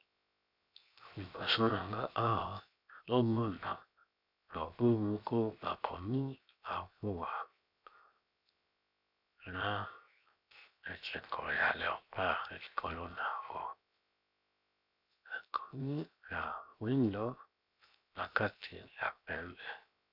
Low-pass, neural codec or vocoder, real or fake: 5.4 kHz; codec, 16 kHz, 0.7 kbps, FocalCodec; fake